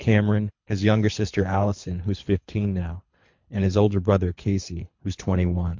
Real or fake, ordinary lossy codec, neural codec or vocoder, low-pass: fake; MP3, 48 kbps; codec, 24 kHz, 3 kbps, HILCodec; 7.2 kHz